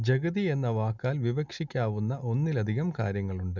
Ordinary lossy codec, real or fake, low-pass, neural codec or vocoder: none; real; 7.2 kHz; none